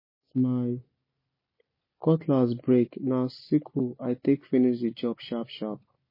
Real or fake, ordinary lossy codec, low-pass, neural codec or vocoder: real; MP3, 24 kbps; 5.4 kHz; none